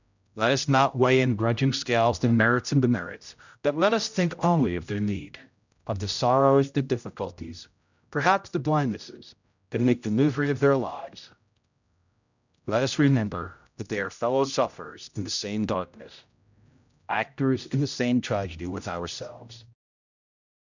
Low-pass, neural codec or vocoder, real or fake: 7.2 kHz; codec, 16 kHz, 0.5 kbps, X-Codec, HuBERT features, trained on general audio; fake